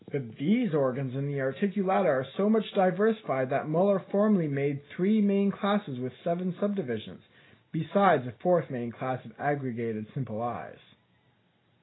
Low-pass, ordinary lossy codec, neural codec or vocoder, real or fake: 7.2 kHz; AAC, 16 kbps; none; real